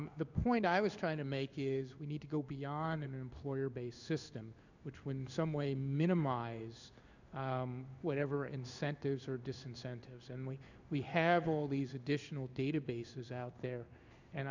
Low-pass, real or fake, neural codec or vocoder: 7.2 kHz; fake; codec, 16 kHz in and 24 kHz out, 1 kbps, XY-Tokenizer